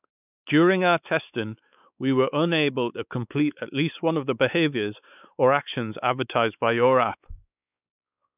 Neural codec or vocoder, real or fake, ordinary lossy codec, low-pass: codec, 16 kHz, 4 kbps, X-Codec, WavLM features, trained on Multilingual LibriSpeech; fake; none; 3.6 kHz